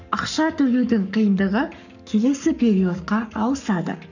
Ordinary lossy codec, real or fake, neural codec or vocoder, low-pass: none; fake; codec, 44.1 kHz, 7.8 kbps, Pupu-Codec; 7.2 kHz